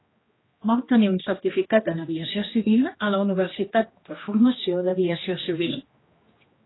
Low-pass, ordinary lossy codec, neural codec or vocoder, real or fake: 7.2 kHz; AAC, 16 kbps; codec, 16 kHz, 1 kbps, X-Codec, HuBERT features, trained on general audio; fake